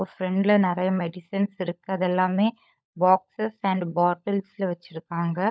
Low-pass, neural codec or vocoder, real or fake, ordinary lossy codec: none; codec, 16 kHz, 16 kbps, FunCodec, trained on LibriTTS, 50 frames a second; fake; none